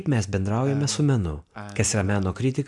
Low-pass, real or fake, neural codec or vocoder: 10.8 kHz; real; none